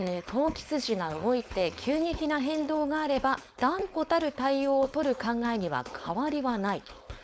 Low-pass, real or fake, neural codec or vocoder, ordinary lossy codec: none; fake; codec, 16 kHz, 4.8 kbps, FACodec; none